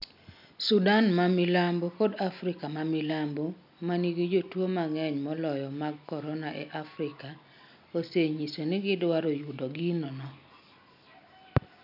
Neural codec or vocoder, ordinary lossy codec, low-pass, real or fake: none; none; 5.4 kHz; real